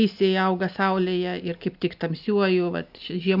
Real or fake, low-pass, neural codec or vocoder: real; 5.4 kHz; none